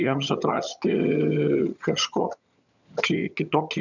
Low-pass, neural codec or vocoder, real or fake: 7.2 kHz; vocoder, 22.05 kHz, 80 mel bands, HiFi-GAN; fake